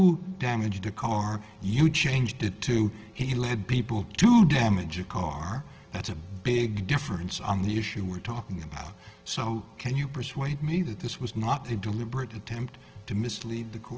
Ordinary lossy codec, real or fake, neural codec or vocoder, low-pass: Opus, 16 kbps; real; none; 7.2 kHz